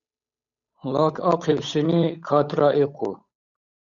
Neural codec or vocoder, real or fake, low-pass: codec, 16 kHz, 8 kbps, FunCodec, trained on Chinese and English, 25 frames a second; fake; 7.2 kHz